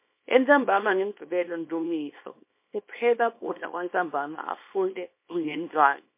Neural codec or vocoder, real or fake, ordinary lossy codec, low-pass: codec, 24 kHz, 0.9 kbps, WavTokenizer, small release; fake; MP3, 24 kbps; 3.6 kHz